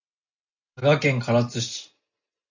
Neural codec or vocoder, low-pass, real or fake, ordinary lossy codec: none; 7.2 kHz; real; AAC, 48 kbps